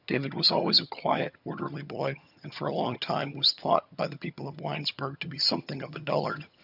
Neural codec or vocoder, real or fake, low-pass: vocoder, 22.05 kHz, 80 mel bands, HiFi-GAN; fake; 5.4 kHz